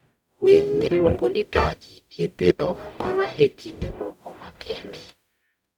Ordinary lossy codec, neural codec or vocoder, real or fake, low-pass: none; codec, 44.1 kHz, 0.9 kbps, DAC; fake; 19.8 kHz